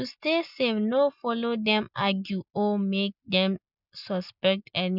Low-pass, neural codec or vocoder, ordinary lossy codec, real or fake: 5.4 kHz; none; none; real